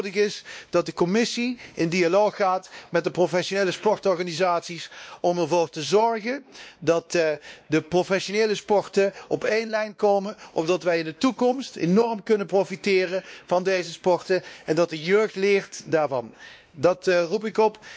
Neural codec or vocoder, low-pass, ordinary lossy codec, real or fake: codec, 16 kHz, 2 kbps, X-Codec, WavLM features, trained on Multilingual LibriSpeech; none; none; fake